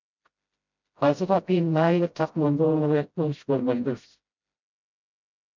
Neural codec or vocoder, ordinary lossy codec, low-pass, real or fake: codec, 16 kHz, 0.5 kbps, FreqCodec, smaller model; MP3, 64 kbps; 7.2 kHz; fake